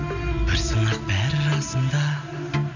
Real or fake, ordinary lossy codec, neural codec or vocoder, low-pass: real; none; none; 7.2 kHz